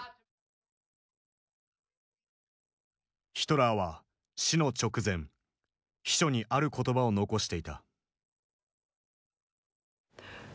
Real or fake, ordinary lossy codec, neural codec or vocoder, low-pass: real; none; none; none